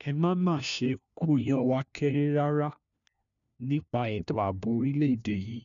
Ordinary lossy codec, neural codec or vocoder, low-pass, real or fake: none; codec, 16 kHz, 1 kbps, FunCodec, trained on LibriTTS, 50 frames a second; 7.2 kHz; fake